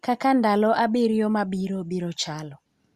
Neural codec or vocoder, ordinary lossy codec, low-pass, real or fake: none; Opus, 64 kbps; 14.4 kHz; real